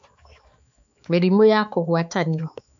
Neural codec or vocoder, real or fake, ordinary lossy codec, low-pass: codec, 16 kHz, 4 kbps, X-Codec, HuBERT features, trained on balanced general audio; fake; none; 7.2 kHz